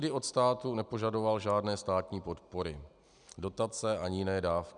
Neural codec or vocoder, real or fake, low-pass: none; real; 9.9 kHz